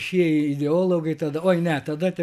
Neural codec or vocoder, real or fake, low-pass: none; real; 14.4 kHz